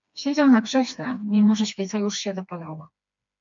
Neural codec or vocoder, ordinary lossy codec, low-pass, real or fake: codec, 16 kHz, 2 kbps, FreqCodec, smaller model; MP3, 64 kbps; 7.2 kHz; fake